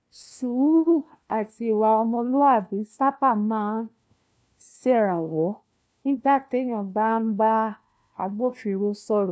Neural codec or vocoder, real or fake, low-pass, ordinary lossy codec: codec, 16 kHz, 1 kbps, FunCodec, trained on LibriTTS, 50 frames a second; fake; none; none